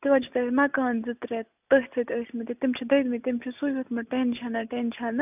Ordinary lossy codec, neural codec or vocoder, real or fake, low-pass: none; none; real; 3.6 kHz